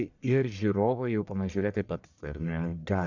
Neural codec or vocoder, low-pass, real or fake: codec, 44.1 kHz, 1.7 kbps, Pupu-Codec; 7.2 kHz; fake